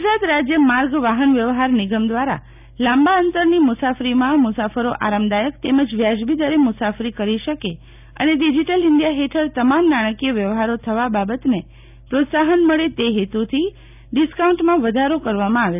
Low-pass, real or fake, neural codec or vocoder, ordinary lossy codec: 3.6 kHz; real; none; none